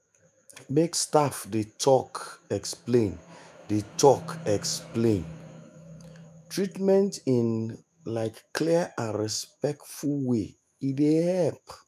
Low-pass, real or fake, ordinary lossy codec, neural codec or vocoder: 14.4 kHz; fake; none; autoencoder, 48 kHz, 128 numbers a frame, DAC-VAE, trained on Japanese speech